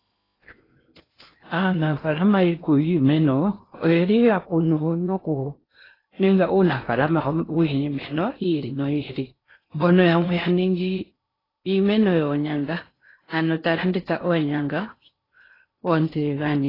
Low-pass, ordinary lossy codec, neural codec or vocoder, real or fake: 5.4 kHz; AAC, 24 kbps; codec, 16 kHz in and 24 kHz out, 0.8 kbps, FocalCodec, streaming, 65536 codes; fake